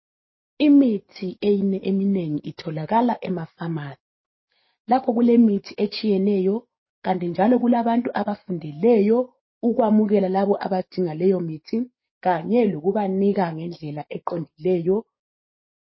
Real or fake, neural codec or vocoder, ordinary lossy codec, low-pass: fake; codec, 44.1 kHz, 7.8 kbps, DAC; MP3, 24 kbps; 7.2 kHz